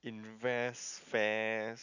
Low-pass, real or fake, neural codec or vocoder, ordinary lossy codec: 7.2 kHz; real; none; none